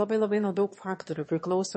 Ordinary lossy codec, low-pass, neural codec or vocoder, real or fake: MP3, 32 kbps; 9.9 kHz; autoencoder, 22.05 kHz, a latent of 192 numbers a frame, VITS, trained on one speaker; fake